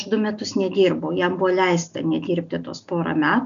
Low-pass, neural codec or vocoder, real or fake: 7.2 kHz; none; real